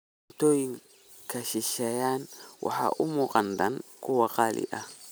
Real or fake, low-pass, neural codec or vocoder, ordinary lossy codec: real; none; none; none